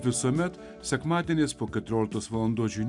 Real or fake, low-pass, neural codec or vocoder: real; 10.8 kHz; none